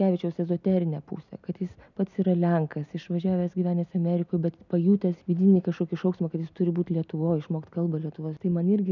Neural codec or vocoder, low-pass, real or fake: none; 7.2 kHz; real